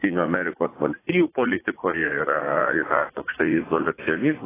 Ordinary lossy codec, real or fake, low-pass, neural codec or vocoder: AAC, 16 kbps; fake; 3.6 kHz; vocoder, 44.1 kHz, 80 mel bands, Vocos